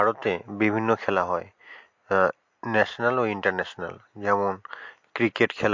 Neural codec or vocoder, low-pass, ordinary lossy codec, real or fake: none; 7.2 kHz; MP3, 48 kbps; real